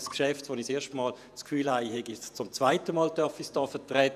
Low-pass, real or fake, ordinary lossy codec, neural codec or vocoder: 14.4 kHz; fake; none; vocoder, 48 kHz, 128 mel bands, Vocos